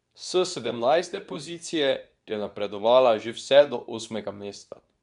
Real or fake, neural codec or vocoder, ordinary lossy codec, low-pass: fake; codec, 24 kHz, 0.9 kbps, WavTokenizer, medium speech release version 2; none; 10.8 kHz